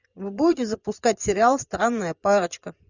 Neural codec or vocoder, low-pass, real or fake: vocoder, 24 kHz, 100 mel bands, Vocos; 7.2 kHz; fake